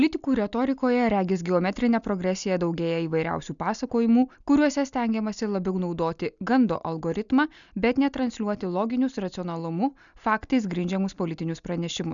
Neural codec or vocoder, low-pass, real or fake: none; 7.2 kHz; real